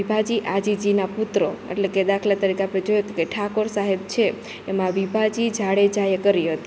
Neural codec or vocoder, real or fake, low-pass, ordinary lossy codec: none; real; none; none